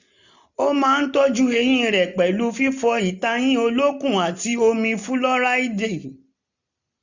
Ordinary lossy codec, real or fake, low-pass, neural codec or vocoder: none; real; 7.2 kHz; none